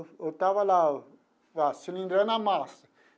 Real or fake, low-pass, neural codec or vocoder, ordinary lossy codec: real; none; none; none